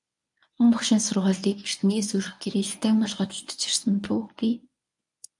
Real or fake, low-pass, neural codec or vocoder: fake; 10.8 kHz; codec, 24 kHz, 0.9 kbps, WavTokenizer, medium speech release version 1